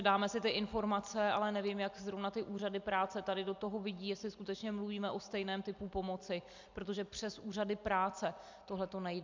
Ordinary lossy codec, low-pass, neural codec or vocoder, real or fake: MP3, 64 kbps; 7.2 kHz; none; real